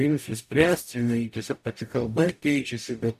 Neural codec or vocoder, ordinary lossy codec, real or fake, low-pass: codec, 44.1 kHz, 0.9 kbps, DAC; AAC, 96 kbps; fake; 14.4 kHz